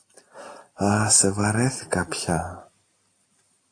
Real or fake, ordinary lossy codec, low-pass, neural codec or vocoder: real; AAC, 48 kbps; 9.9 kHz; none